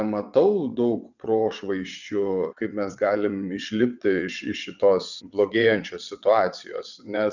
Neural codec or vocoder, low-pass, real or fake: vocoder, 22.05 kHz, 80 mel bands, WaveNeXt; 7.2 kHz; fake